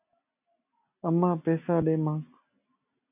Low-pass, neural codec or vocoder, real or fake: 3.6 kHz; none; real